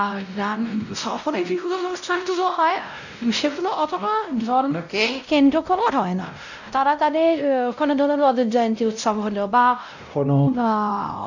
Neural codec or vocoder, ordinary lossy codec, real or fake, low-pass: codec, 16 kHz, 0.5 kbps, X-Codec, WavLM features, trained on Multilingual LibriSpeech; none; fake; 7.2 kHz